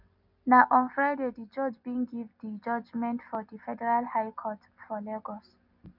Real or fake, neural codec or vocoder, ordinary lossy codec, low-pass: real; none; none; 5.4 kHz